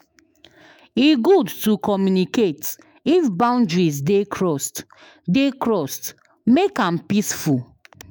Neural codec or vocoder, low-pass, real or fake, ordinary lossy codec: autoencoder, 48 kHz, 128 numbers a frame, DAC-VAE, trained on Japanese speech; none; fake; none